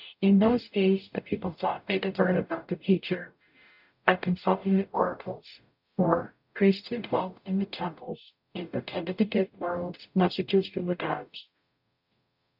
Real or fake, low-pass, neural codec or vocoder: fake; 5.4 kHz; codec, 44.1 kHz, 0.9 kbps, DAC